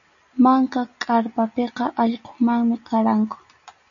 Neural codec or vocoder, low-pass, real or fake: none; 7.2 kHz; real